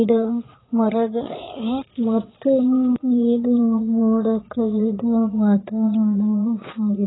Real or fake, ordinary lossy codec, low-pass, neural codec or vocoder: fake; AAC, 16 kbps; 7.2 kHz; vocoder, 22.05 kHz, 80 mel bands, Vocos